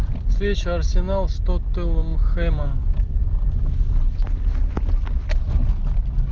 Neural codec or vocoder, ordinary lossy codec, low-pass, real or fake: none; Opus, 32 kbps; 7.2 kHz; real